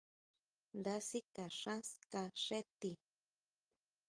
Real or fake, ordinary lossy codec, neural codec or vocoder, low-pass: real; Opus, 32 kbps; none; 9.9 kHz